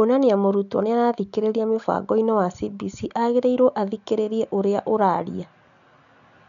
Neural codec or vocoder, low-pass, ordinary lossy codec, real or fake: none; 7.2 kHz; none; real